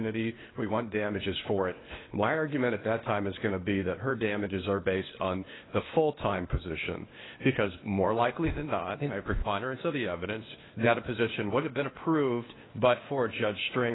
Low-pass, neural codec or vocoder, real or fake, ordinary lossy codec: 7.2 kHz; codec, 16 kHz, 0.8 kbps, ZipCodec; fake; AAC, 16 kbps